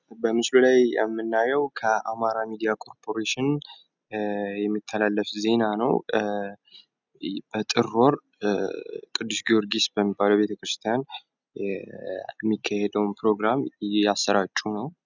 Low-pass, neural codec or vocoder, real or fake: 7.2 kHz; none; real